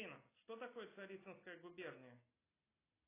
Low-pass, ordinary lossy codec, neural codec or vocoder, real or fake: 3.6 kHz; AAC, 16 kbps; none; real